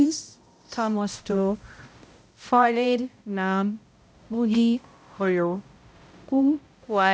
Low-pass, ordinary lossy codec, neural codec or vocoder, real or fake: none; none; codec, 16 kHz, 0.5 kbps, X-Codec, HuBERT features, trained on balanced general audio; fake